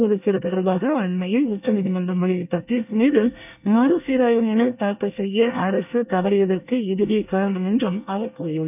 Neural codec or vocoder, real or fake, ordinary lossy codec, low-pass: codec, 24 kHz, 1 kbps, SNAC; fake; none; 3.6 kHz